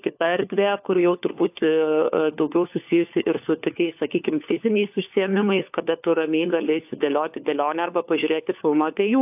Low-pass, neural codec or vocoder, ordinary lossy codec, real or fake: 3.6 kHz; codec, 16 kHz, 4 kbps, FunCodec, trained on LibriTTS, 50 frames a second; AAC, 32 kbps; fake